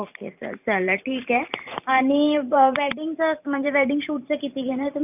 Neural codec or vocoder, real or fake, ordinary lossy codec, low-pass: vocoder, 44.1 kHz, 128 mel bands every 512 samples, BigVGAN v2; fake; none; 3.6 kHz